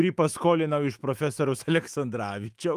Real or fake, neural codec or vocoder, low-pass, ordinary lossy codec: fake; autoencoder, 48 kHz, 128 numbers a frame, DAC-VAE, trained on Japanese speech; 14.4 kHz; Opus, 32 kbps